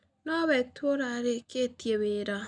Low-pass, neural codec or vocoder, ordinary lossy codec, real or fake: 9.9 kHz; none; none; real